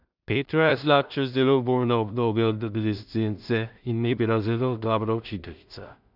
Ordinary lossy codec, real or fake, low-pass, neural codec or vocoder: none; fake; 5.4 kHz; codec, 16 kHz in and 24 kHz out, 0.4 kbps, LongCat-Audio-Codec, two codebook decoder